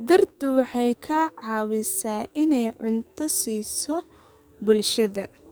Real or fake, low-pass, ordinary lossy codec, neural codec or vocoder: fake; none; none; codec, 44.1 kHz, 2.6 kbps, SNAC